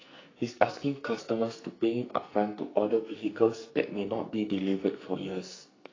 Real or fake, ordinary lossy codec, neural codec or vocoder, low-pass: fake; AAC, 32 kbps; codec, 44.1 kHz, 2.6 kbps, SNAC; 7.2 kHz